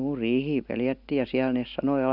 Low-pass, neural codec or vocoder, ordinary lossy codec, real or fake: 5.4 kHz; none; none; real